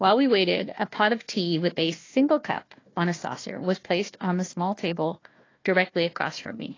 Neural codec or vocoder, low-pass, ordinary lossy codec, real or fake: codec, 16 kHz, 1 kbps, FunCodec, trained on Chinese and English, 50 frames a second; 7.2 kHz; AAC, 32 kbps; fake